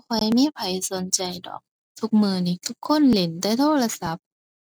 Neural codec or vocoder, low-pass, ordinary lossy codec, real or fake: none; none; none; real